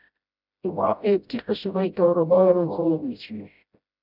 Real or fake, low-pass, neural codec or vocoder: fake; 5.4 kHz; codec, 16 kHz, 0.5 kbps, FreqCodec, smaller model